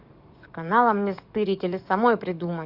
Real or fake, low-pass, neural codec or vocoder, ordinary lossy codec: fake; 5.4 kHz; codec, 44.1 kHz, 7.8 kbps, DAC; Opus, 64 kbps